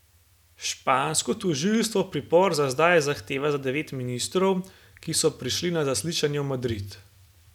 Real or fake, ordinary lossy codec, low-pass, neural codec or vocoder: real; none; 19.8 kHz; none